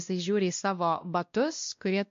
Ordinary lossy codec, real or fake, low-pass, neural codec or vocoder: MP3, 48 kbps; fake; 7.2 kHz; codec, 16 kHz, 1 kbps, X-Codec, WavLM features, trained on Multilingual LibriSpeech